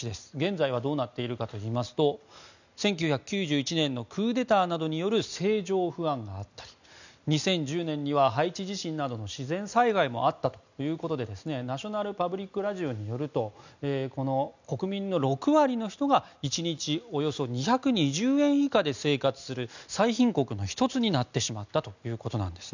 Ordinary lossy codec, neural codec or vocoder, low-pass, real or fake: none; none; 7.2 kHz; real